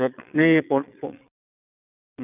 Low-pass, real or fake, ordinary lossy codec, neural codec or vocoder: 3.6 kHz; fake; none; vocoder, 22.05 kHz, 80 mel bands, Vocos